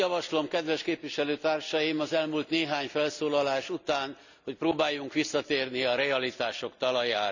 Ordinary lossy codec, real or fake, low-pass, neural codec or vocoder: none; real; 7.2 kHz; none